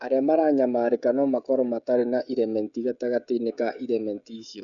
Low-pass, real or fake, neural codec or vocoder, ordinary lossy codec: 7.2 kHz; fake; codec, 16 kHz, 16 kbps, FreqCodec, smaller model; none